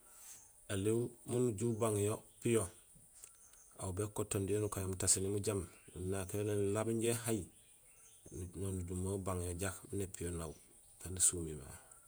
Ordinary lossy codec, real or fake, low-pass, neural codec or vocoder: none; fake; none; vocoder, 48 kHz, 128 mel bands, Vocos